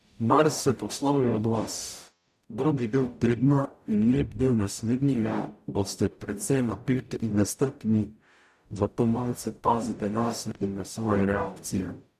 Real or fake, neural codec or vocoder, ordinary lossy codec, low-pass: fake; codec, 44.1 kHz, 0.9 kbps, DAC; none; 14.4 kHz